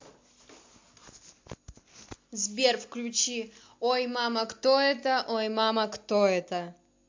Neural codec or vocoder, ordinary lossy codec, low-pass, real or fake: none; MP3, 48 kbps; 7.2 kHz; real